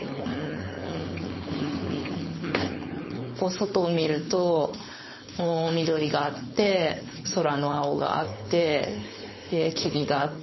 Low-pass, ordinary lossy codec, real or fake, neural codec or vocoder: 7.2 kHz; MP3, 24 kbps; fake; codec, 16 kHz, 4.8 kbps, FACodec